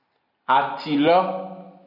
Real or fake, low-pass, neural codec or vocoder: real; 5.4 kHz; none